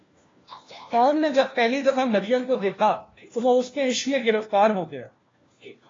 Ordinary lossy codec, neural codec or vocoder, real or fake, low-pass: AAC, 32 kbps; codec, 16 kHz, 1 kbps, FunCodec, trained on LibriTTS, 50 frames a second; fake; 7.2 kHz